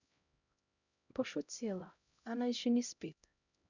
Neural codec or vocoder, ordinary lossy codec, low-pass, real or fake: codec, 16 kHz, 0.5 kbps, X-Codec, HuBERT features, trained on LibriSpeech; none; 7.2 kHz; fake